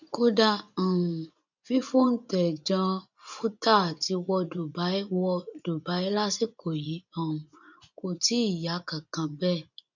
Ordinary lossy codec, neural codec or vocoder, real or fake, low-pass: none; vocoder, 24 kHz, 100 mel bands, Vocos; fake; 7.2 kHz